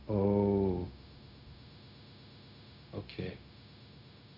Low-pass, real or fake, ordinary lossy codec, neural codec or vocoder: 5.4 kHz; fake; none; codec, 16 kHz, 0.4 kbps, LongCat-Audio-Codec